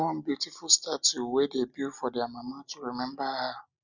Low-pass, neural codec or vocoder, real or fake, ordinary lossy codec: 7.2 kHz; none; real; none